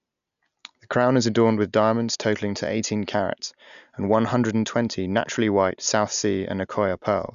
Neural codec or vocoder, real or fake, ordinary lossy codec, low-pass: none; real; none; 7.2 kHz